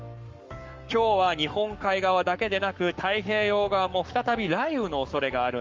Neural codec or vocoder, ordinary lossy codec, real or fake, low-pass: codec, 44.1 kHz, 7.8 kbps, Pupu-Codec; Opus, 32 kbps; fake; 7.2 kHz